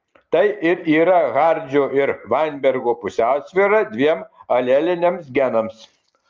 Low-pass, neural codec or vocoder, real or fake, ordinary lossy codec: 7.2 kHz; none; real; Opus, 24 kbps